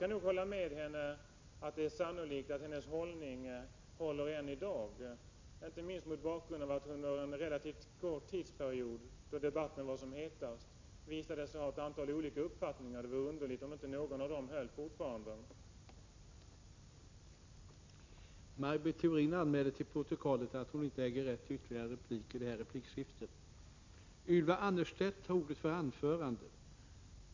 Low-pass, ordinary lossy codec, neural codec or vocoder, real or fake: 7.2 kHz; MP3, 48 kbps; none; real